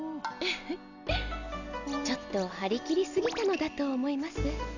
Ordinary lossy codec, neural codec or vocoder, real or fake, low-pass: none; none; real; 7.2 kHz